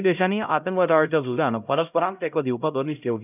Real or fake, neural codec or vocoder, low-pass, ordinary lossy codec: fake; codec, 16 kHz, 0.5 kbps, X-Codec, HuBERT features, trained on LibriSpeech; 3.6 kHz; none